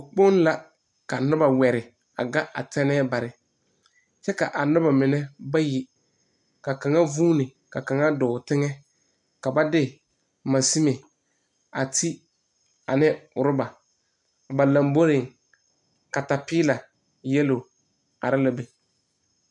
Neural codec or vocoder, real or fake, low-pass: none; real; 10.8 kHz